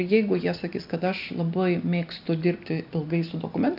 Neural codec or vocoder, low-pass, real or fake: none; 5.4 kHz; real